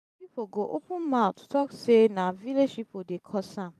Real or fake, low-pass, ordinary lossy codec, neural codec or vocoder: fake; 14.4 kHz; AAC, 96 kbps; vocoder, 44.1 kHz, 128 mel bands every 512 samples, BigVGAN v2